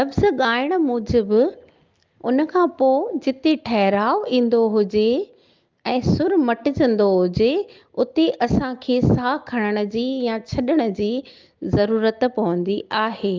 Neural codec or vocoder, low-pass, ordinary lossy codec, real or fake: none; 7.2 kHz; Opus, 32 kbps; real